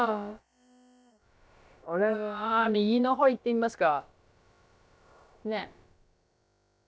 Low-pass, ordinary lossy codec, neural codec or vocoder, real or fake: none; none; codec, 16 kHz, about 1 kbps, DyCAST, with the encoder's durations; fake